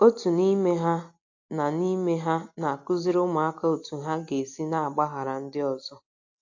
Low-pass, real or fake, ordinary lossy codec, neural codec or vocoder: 7.2 kHz; real; AAC, 48 kbps; none